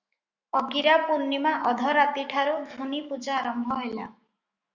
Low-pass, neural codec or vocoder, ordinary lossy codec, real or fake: 7.2 kHz; autoencoder, 48 kHz, 128 numbers a frame, DAC-VAE, trained on Japanese speech; Opus, 64 kbps; fake